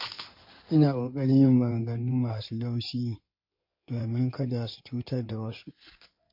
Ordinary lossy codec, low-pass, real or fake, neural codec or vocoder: MP3, 32 kbps; 5.4 kHz; fake; codec, 16 kHz in and 24 kHz out, 2.2 kbps, FireRedTTS-2 codec